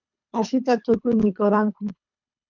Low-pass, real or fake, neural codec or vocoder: 7.2 kHz; fake; codec, 24 kHz, 3 kbps, HILCodec